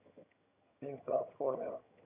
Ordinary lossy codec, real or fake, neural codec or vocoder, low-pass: none; fake; vocoder, 22.05 kHz, 80 mel bands, HiFi-GAN; 3.6 kHz